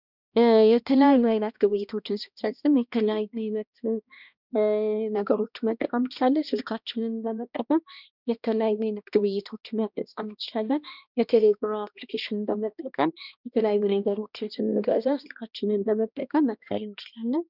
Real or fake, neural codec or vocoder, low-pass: fake; codec, 16 kHz, 1 kbps, X-Codec, HuBERT features, trained on balanced general audio; 5.4 kHz